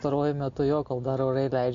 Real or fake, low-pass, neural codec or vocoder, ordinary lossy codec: real; 7.2 kHz; none; MP3, 64 kbps